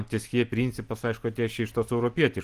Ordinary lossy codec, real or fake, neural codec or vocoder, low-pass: Opus, 24 kbps; real; none; 14.4 kHz